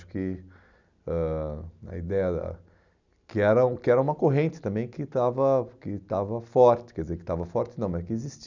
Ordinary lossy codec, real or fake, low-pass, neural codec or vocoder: none; real; 7.2 kHz; none